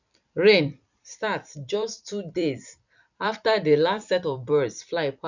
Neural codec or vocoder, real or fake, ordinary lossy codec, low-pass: vocoder, 44.1 kHz, 128 mel bands every 256 samples, BigVGAN v2; fake; none; 7.2 kHz